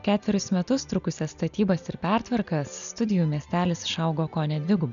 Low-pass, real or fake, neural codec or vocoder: 7.2 kHz; real; none